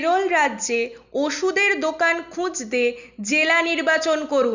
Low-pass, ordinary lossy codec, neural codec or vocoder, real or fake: 7.2 kHz; none; none; real